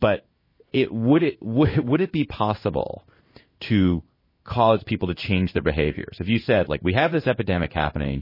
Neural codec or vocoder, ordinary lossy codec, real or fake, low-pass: codec, 16 kHz in and 24 kHz out, 1 kbps, XY-Tokenizer; MP3, 24 kbps; fake; 5.4 kHz